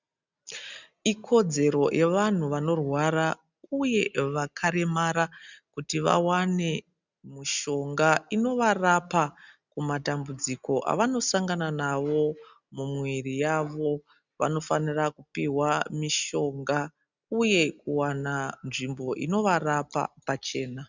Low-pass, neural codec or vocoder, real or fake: 7.2 kHz; none; real